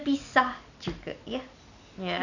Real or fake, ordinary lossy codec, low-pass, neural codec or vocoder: real; none; 7.2 kHz; none